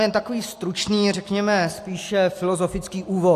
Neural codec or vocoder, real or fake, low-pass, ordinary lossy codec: none; real; 14.4 kHz; AAC, 64 kbps